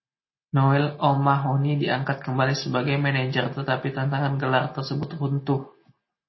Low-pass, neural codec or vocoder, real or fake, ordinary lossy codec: 7.2 kHz; none; real; MP3, 24 kbps